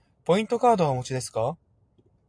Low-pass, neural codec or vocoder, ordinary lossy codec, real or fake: 9.9 kHz; none; AAC, 64 kbps; real